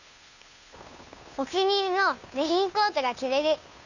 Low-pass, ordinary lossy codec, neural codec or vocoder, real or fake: 7.2 kHz; none; codec, 16 kHz, 2 kbps, FunCodec, trained on Chinese and English, 25 frames a second; fake